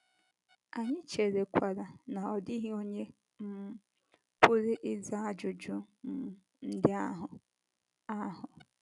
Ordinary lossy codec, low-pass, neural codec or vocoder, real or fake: none; 10.8 kHz; none; real